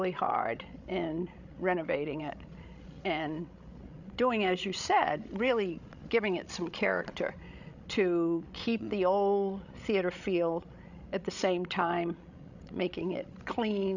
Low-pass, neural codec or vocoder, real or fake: 7.2 kHz; codec, 16 kHz, 16 kbps, FreqCodec, larger model; fake